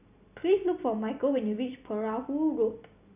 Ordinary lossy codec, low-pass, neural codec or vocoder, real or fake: none; 3.6 kHz; none; real